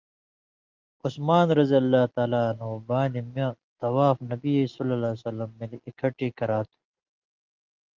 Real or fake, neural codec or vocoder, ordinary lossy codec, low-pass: real; none; Opus, 24 kbps; 7.2 kHz